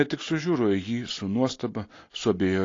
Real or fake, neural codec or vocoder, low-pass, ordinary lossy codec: real; none; 7.2 kHz; AAC, 32 kbps